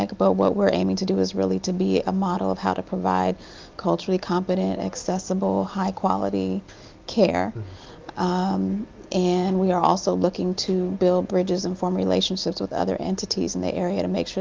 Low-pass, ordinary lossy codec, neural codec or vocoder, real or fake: 7.2 kHz; Opus, 24 kbps; none; real